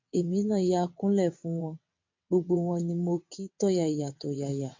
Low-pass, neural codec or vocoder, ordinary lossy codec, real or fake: 7.2 kHz; vocoder, 22.05 kHz, 80 mel bands, WaveNeXt; MP3, 48 kbps; fake